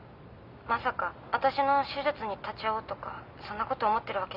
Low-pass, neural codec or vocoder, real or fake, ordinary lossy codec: 5.4 kHz; none; real; none